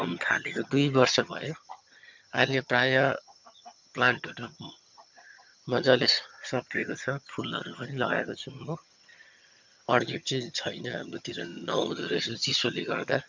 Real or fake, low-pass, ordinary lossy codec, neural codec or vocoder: fake; 7.2 kHz; MP3, 64 kbps; vocoder, 22.05 kHz, 80 mel bands, HiFi-GAN